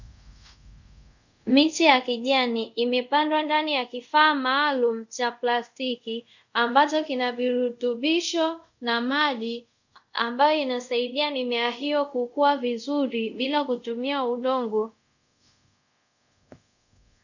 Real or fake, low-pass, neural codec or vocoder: fake; 7.2 kHz; codec, 24 kHz, 0.5 kbps, DualCodec